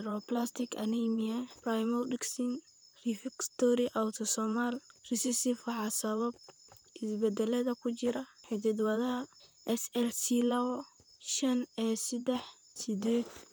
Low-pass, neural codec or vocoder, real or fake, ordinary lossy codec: none; vocoder, 44.1 kHz, 128 mel bands, Pupu-Vocoder; fake; none